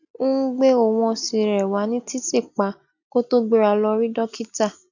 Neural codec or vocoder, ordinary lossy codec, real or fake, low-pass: none; none; real; 7.2 kHz